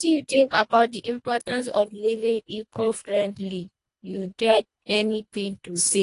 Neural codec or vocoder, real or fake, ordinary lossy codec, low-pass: codec, 24 kHz, 1.5 kbps, HILCodec; fake; AAC, 48 kbps; 10.8 kHz